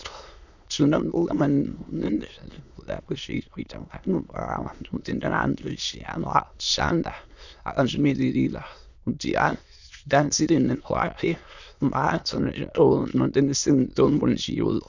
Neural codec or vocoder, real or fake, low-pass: autoencoder, 22.05 kHz, a latent of 192 numbers a frame, VITS, trained on many speakers; fake; 7.2 kHz